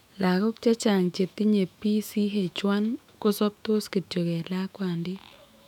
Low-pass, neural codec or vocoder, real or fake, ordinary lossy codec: 19.8 kHz; autoencoder, 48 kHz, 128 numbers a frame, DAC-VAE, trained on Japanese speech; fake; none